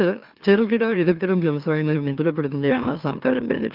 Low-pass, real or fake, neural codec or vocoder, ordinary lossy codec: 5.4 kHz; fake; autoencoder, 44.1 kHz, a latent of 192 numbers a frame, MeloTTS; Opus, 32 kbps